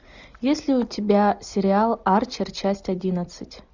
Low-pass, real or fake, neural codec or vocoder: 7.2 kHz; real; none